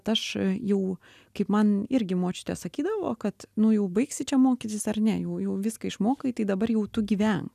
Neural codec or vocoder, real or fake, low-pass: none; real; 14.4 kHz